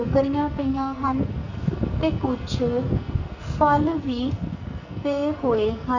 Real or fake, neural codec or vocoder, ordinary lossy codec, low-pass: fake; codec, 44.1 kHz, 2.6 kbps, SNAC; none; 7.2 kHz